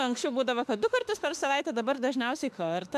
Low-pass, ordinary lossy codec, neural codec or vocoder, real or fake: 14.4 kHz; MP3, 96 kbps; autoencoder, 48 kHz, 32 numbers a frame, DAC-VAE, trained on Japanese speech; fake